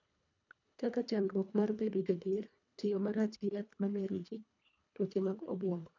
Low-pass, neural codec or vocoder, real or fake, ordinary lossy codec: 7.2 kHz; codec, 24 kHz, 1.5 kbps, HILCodec; fake; none